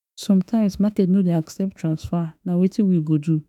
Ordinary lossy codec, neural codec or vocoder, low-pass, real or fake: none; autoencoder, 48 kHz, 32 numbers a frame, DAC-VAE, trained on Japanese speech; 19.8 kHz; fake